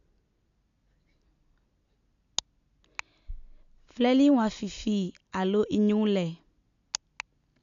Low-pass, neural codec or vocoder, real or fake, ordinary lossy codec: 7.2 kHz; none; real; none